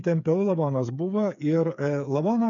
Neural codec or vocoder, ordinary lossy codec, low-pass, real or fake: codec, 16 kHz, 8 kbps, FreqCodec, smaller model; MP3, 64 kbps; 7.2 kHz; fake